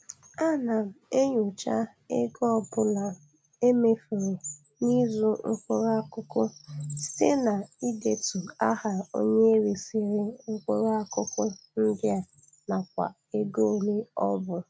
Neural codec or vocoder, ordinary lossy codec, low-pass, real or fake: none; none; none; real